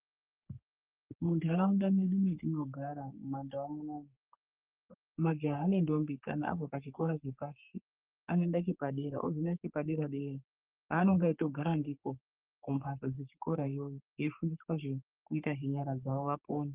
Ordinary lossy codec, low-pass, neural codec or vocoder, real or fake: Opus, 32 kbps; 3.6 kHz; codec, 44.1 kHz, 3.4 kbps, Pupu-Codec; fake